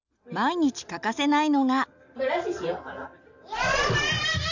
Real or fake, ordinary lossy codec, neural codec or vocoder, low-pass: real; none; none; 7.2 kHz